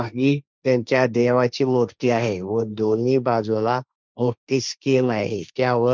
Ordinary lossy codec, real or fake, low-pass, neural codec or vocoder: none; fake; none; codec, 16 kHz, 1.1 kbps, Voila-Tokenizer